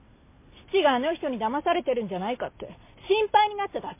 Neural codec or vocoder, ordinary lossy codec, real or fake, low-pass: none; MP3, 24 kbps; real; 3.6 kHz